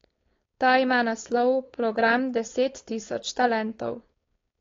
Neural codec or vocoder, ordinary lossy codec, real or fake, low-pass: codec, 16 kHz, 4.8 kbps, FACodec; AAC, 32 kbps; fake; 7.2 kHz